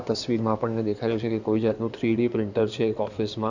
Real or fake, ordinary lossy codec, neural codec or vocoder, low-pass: fake; none; codec, 16 kHz in and 24 kHz out, 2.2 kbps, FireRedTTS-2 codec; 7.2 kHz